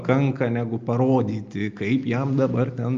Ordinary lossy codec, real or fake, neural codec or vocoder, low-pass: Opus, 24 kbps; real; none; 7.2 kHz